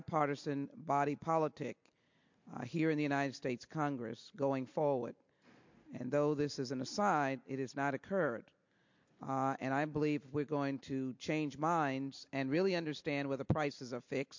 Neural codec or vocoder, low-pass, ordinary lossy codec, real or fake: none; 7.2 kHz; MP3, 48 kbps; real